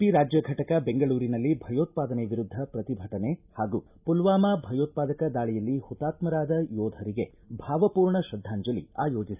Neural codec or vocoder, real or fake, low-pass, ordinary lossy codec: none; real; 3.6 kHz; none